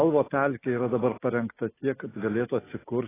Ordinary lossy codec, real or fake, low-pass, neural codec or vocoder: AAC, 16 kbps; real; 3.6 kHz; none